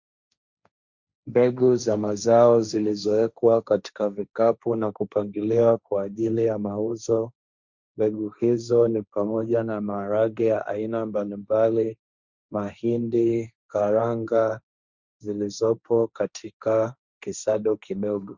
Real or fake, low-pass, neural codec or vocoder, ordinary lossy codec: fake; 7.2 kHz; codec, 16 kHz, 1.1 kbps, Voila-Tokenizer; Opus, 64 kbps